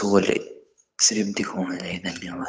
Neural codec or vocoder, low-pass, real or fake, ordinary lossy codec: codec, 16 kHz, 8 kbps, FunCodec, trained on Chinese and English, 25 frames a second; none; fake; none